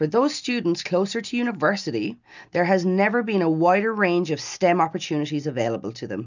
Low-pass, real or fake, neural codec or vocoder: 7.2 kHz; real; none